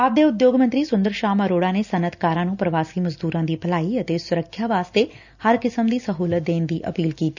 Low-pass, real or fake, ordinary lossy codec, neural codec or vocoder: 7.2 kHz; real; none; none